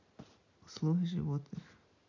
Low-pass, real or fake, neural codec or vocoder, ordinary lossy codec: 7.2 kHz; real; none; none